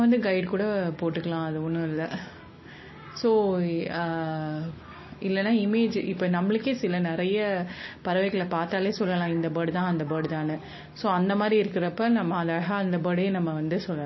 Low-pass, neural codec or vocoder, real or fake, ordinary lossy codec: 7.2 kHz; none; real; MP3, 24 kbps